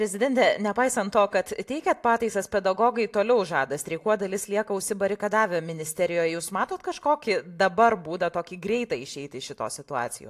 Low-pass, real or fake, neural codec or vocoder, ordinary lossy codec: 14.4 kHz; real; none; AAC, 64 kbps